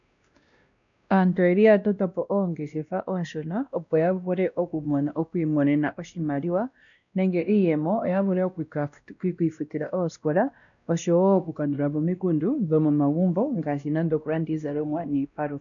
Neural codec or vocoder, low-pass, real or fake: codec, 16 kHz, 1 kbps, X-Codec, WavLM features, trained on Multilingual LibriSpeech; 7.2 kHz; fake